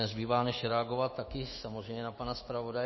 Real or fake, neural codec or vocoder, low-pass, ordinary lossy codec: fake; autoencoder, 48 kHz, 128 numbers a frame, DAC-VAE, trained on Japanese speech; 7.2 kHz; MP3, 24 kbps